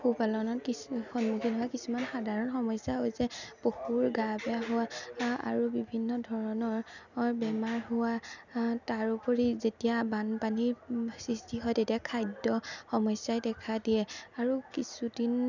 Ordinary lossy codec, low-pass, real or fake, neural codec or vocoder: none; 7.2 kHz; real; none